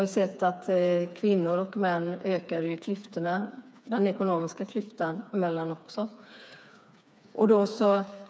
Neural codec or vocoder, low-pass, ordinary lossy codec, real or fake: codec, 16 kHz, 4 kbps, FreqCodec, smaller model; none; none; fake